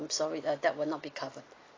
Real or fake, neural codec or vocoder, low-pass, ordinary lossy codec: real; none; 7.2 kHz; AAC, 32 kbps